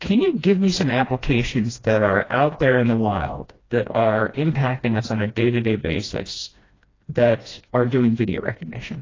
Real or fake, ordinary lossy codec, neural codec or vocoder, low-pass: fake; AAC, 32 kbps; codec, 16 kHz, 1 kbps, FreqCodec, smaller model; 7.2 kHz